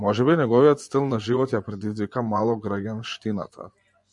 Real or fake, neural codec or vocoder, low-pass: fake; vocoder, 24 kHz, 100 mel bands, Vocos; 10.8 kHz